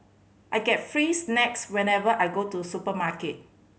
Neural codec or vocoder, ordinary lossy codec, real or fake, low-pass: none; none; real; none